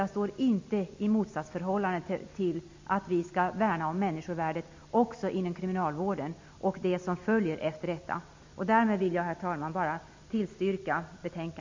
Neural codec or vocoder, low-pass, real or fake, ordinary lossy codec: none; 7.2 kHz; real; MP3, 48 kbps